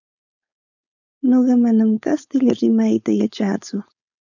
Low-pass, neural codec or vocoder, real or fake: 7.2 kHz; codec, 16 kHz, 4.8 kbps, FACodec; fake